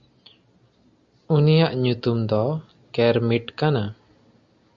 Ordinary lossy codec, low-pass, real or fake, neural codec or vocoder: Opus, 64 kbps; 7.2 kHz; real; none